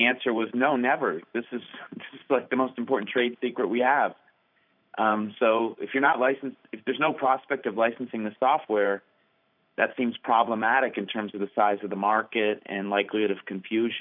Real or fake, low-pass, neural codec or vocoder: fake; 5.4 kHz; vocoder, 44.1 kHz, 128 mel bands every 512 samples, BigVGAN v2